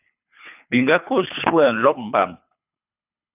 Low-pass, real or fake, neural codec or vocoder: 3.6 kHz; fake; codec, 24 kHz, 3 kbps, HILCodec